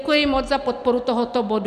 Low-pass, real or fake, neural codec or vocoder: 14.4 kHz; real; none